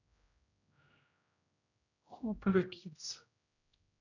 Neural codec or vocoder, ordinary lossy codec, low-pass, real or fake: codec, 16 kHz, 0.5 kbps, X-Codec, HuBERT features, trained on general audio; none; 7.2 kHz; fake